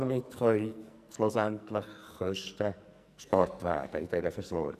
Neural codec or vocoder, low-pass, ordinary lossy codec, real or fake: codec, 44.1 kHz, 2.6 kbps, SNAC; 14.4 kHz; none; fake